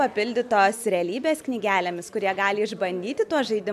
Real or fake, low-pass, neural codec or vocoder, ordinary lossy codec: real; 14.4 kHz; none; MP3, 96 kbps